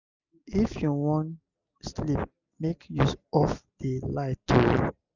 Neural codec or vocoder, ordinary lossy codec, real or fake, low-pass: none; none; real; 7.2 kHz